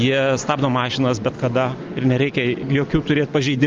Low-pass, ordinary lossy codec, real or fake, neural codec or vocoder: 7.2 kHz; Opus, 32 kbps; real; none